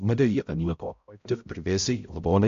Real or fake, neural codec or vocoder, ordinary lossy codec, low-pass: fake; codec, 16 kHz, 0.5 kbps, X-Codec, HuBERT features, trained on balanced general audio; MP3, 48 kbps; 7.2 kHz